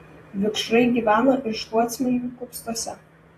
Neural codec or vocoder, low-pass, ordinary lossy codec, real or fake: vocoder, 48 kHz, 128 mel bands, Vocos; 14.4 kHz; AAC, 48 kbps; fake